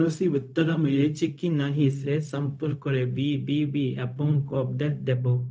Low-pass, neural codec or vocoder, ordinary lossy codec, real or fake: none; codec, 16 kHz, 0.4 kbps, LongCat-Audio-Codec; none; fake